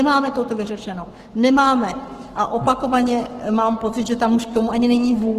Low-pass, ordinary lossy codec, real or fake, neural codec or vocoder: 14.4 kHz; Opus, 16 kbps; fake; codec, 44.1 kHz, 7.8 kbps, Pupu-Codec